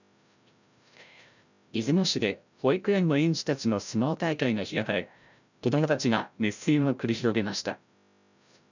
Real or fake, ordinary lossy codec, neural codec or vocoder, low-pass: fake; none; codec, 16 kHz, 0.5 kbps, FreqCodec, larger model; 7.2 kHz